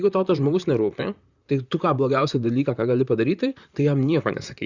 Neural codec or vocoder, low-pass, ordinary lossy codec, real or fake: vocoder, 44.1 kHz, 128 mel bands, Pupu-Vocoder; 7.2 kHz; Opus, 64 kbps; fake